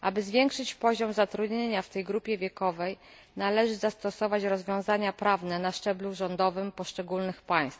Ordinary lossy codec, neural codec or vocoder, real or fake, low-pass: none; none; real; none